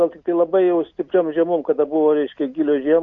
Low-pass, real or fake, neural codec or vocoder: 7.2 kHz; real; none